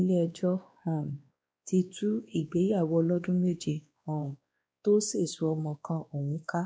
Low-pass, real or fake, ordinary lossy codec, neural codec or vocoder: none; fake; none; codec, 16 kHz, 2 kbps, X-Codec, WavLM features, trained on Multilingual LibriSpeech